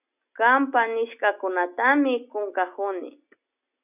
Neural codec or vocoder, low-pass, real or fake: none; 3.6 kHz; real